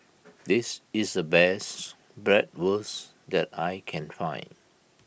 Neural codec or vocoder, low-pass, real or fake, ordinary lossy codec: none; none; real; none